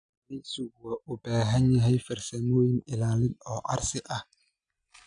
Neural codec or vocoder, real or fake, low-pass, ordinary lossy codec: none; real; 9.9 kHz; none